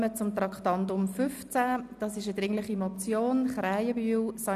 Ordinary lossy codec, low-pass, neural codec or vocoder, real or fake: none; 14.4 kHz; none; real